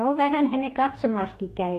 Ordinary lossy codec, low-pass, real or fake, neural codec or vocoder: AAC, 64 kbps; 14.4 kHz; fake; codec, 44.1 kHz, 2.6 kbps, DAC